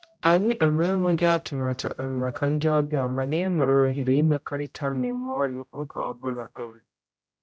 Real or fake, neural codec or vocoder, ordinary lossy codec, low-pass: fake; codec, 16 kHz, 0.5 kbps, X-Codec, HuBERT features, trained on general audio; none; none